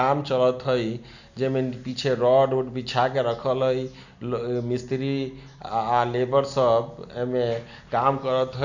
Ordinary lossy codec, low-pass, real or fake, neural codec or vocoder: none; 7.2 kHz; real; none